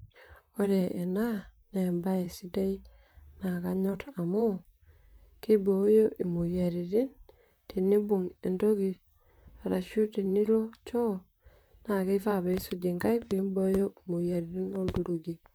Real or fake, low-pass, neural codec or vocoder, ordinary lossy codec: fake; none; vocoder, 44.1 kHz, 128 mel bands, Pupu-Vocoder; none